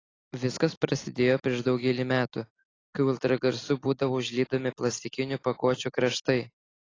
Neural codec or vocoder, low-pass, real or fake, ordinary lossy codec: none; 7.2 kHz; real; AAC, 32 kbps